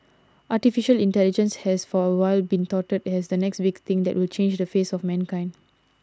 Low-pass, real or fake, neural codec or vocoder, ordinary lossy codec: none; real; none; none